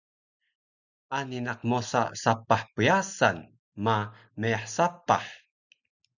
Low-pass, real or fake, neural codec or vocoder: 7.2 kHz; real; none